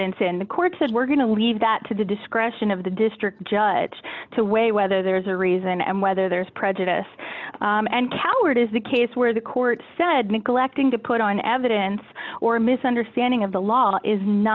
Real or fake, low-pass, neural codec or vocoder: real; 7.2 kHz; none